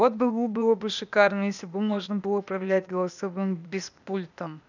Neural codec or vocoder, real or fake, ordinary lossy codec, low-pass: codec, 16 kHz, 0.8 kbps, ZipCodec; fake; none; 7.2 kHz